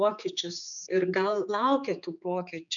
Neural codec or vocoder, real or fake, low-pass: codec, 16 kHz, 4 kbps, X-Codec, HuBERT features, trained on general audio; fake; 7.2 kHz